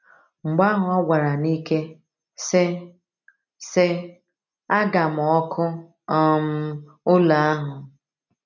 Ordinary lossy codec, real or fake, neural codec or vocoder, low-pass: none; real; none; 7.2 kHz